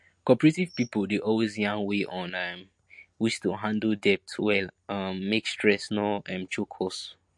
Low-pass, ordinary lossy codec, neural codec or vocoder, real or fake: 10.8 kHz; MP3, 48 kbps; none; real